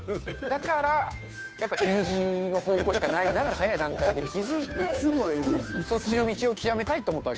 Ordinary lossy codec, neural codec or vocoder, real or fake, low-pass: none; codec, 16 kHz, 2 kbps, FunCodec, trained on Chinese and English, 25 frames a second; fake; none